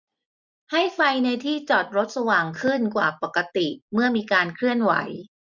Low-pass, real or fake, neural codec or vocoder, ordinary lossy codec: 7.2 kHz; real; none; none